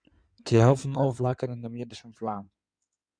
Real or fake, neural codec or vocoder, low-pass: fake; codec, 16 kHz in and 24 kHz out, 2.2 kbps, FireRedTTS-2 codec; 9.9 kHz